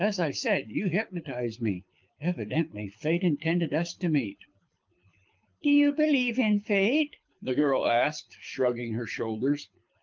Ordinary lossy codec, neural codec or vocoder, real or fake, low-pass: Opus, 24 kbps; codec, 24 kHz, 6 kbps, HILCodec; fake; 7.2 kHz